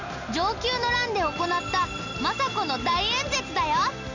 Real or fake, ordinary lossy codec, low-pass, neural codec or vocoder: real; none; 7.2 kHz; none